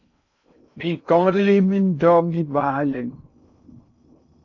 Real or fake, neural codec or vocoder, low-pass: fake; codec, 16 kHz in and 24 kHz out, 0.6 kbps, FocalCodec, streaming, 2048 codes; 7.2 kHz